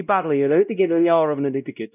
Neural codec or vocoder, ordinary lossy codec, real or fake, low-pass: codec, 16 kHz, 0.5 kbps, X-Codec, WavLM features, trained on Multilingual LibriSpeech; none; fake; 3.6 kHz